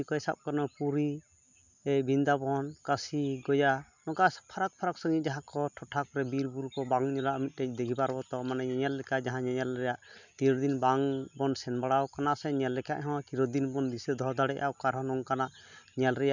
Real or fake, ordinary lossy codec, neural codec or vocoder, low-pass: real; none; none; 7.2 kHz